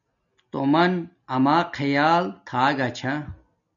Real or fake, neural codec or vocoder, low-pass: real; none; 7.2 kHz